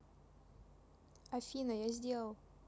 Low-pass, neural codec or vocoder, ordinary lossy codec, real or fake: none; none; none; real